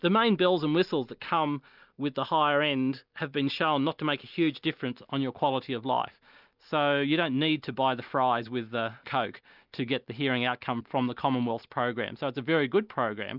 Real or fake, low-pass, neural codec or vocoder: real; 5.4 kHz; none